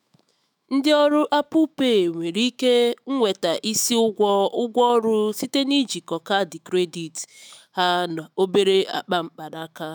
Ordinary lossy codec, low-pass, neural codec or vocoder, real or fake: none; none; autoencoder, 48 kHz, 128 numbers a frame, DAC-VAE, trained on Japanese speech; fake